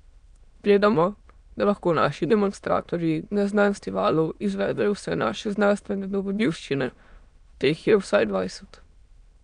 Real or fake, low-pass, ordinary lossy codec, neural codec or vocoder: fake; 9.9 kHz; none; autoencoder, 22.05 kHz, a latent of 192 numbers a frame, VITS, trained on many speakers